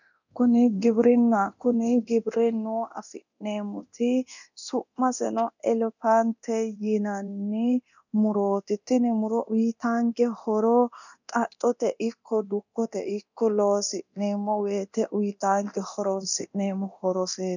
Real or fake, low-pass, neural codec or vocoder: fake; 7.2 kHz; codec, 24 kHz, 0.9 kbps, DualCodec